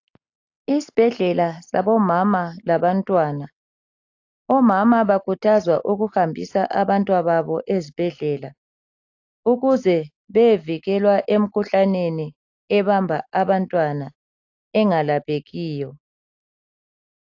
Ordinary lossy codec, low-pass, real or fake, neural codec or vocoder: AAC, 48 kbps; 7.2 kHz; real; none